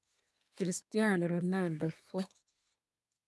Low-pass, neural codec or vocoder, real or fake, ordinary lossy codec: none; codec, 24 kHz, 1 kbps, SNAC; fake; none